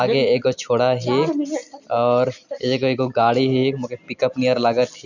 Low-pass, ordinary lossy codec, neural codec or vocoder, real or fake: 7.2 kHz; none; none; real